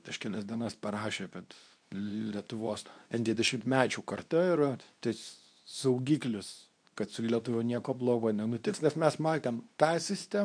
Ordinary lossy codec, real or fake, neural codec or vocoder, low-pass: MP3, 96 kbps; fake; codec, 24 kHz, 0.9 kbps, WavTokenizer, medium speech release version 2; 9.9 kHz